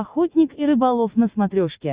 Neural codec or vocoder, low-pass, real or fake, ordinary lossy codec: none; 3.6 kHz; real; Opus, 64 kbps